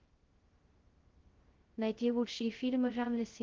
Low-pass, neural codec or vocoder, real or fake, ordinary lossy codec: 7.2 kHz; codec, 16 kHz, 0.2 kbps, FocalCodec; fake; Opus, 16 kbps